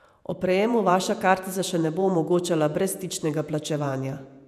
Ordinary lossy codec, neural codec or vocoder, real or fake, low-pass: none; vocoder, 48 kHz, 128 mel bands, Vocos; fake; 14.4 kHz